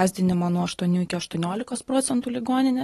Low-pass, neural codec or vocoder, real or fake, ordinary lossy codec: 19.8 kHz; none; real; AAC, 32 kbps